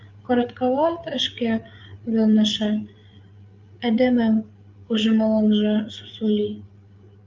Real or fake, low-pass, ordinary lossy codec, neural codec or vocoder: fake; 7.2 kHz; Opus, 24 kbps; codec, 16 kHz, 8 kbps, FreqCodec, larger model